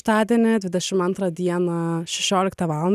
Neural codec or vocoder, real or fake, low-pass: none; real; 14.4 kHz